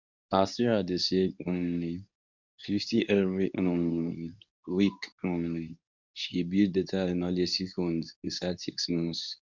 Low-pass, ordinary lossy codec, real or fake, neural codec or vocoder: 7.2 kHz; none; fake; codec, 24 kHz, 0.9 kbps, WavTokenizer, medium speech release version 2